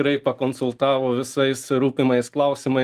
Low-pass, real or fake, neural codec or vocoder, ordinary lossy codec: 14.4 kHz; real; none; Opus, 32 kbps